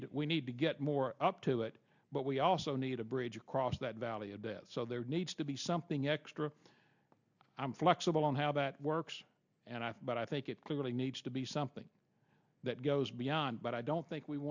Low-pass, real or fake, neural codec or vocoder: 7.2 kHz; real; none